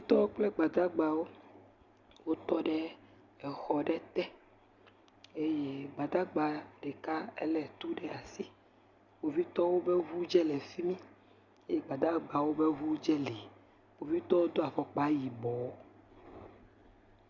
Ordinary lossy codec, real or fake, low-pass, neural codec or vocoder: Opus, 64 kbps; real; 7.2 kHz; none